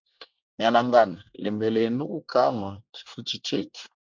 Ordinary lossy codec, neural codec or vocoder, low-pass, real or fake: MP3, 64 kbps; codec, 24 kHz, 1 kbps, SNAC; 7.2 kHz; fake